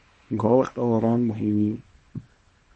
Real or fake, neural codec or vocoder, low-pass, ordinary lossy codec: fake; codec, 24 kHz, 0.9 kbps, WavTokenizer, small release; 10.8 kHz; MP3, 32 kbps